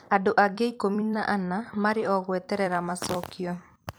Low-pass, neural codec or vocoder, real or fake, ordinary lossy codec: none; vocoder, 44.1 kHz, 128 mel bands every 256 samples, BigVGAN v2; fake; none